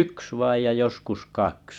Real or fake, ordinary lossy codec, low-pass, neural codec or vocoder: fake; none; 19.8 kHz; vocoder, 44.1 kHz, 128 mel bands every 256 samples, BigVGAN v2